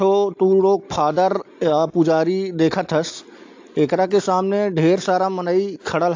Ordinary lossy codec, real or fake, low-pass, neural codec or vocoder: AAC, 48 kbps; real; 7.2 kHz; none